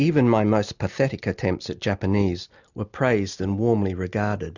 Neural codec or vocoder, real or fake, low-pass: none; real; 7.2 kHz